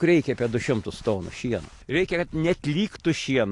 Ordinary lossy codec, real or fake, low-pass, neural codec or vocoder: AAC, 48 kbps; real; 10.8 kHz; none